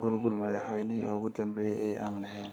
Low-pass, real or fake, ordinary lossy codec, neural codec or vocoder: none; fake; none; codec, 44.1 kHz, 3.4 kbps, Pupu-Codec